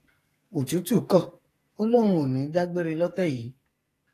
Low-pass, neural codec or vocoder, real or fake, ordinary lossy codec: 14.4 kHz; codec, 44.1 kHz, 2.6 kbps, SNAC; fake; AAC, 48 kbps